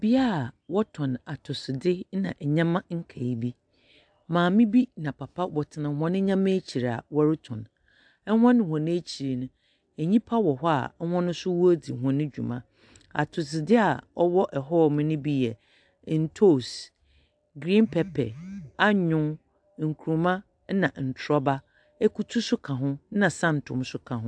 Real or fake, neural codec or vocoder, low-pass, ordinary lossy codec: real; none; 9.9 kHz; AAC, 64 kbps